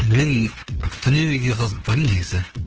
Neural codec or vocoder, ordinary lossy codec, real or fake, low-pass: codec, 16 kHz, 2 kbps, FunCodec, trained on LibriTTS, 25 frames a second; Opus, 16 kbps; fake; 7.2 kHz